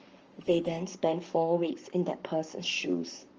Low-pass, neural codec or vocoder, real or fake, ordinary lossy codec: 7.2 kHz; codec, 44.1 kHz, 7.8 kbps, Pupu-Codec; fake; Opus, 24 kbps